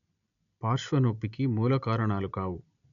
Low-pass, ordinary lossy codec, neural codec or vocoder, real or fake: 7.2 kHz; MP3, 96 kbps; none; real